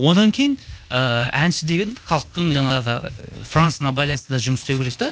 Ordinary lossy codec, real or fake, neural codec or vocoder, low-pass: none; fake; codec, 16 kHz, 0.8 kbps, ZipCodec; none